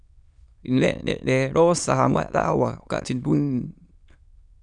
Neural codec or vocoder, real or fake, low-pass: autoencoder, 22.05 kHz, a latent of 192 numbers a frame, VITS, trained on many speakers; fake; 9.9 kHz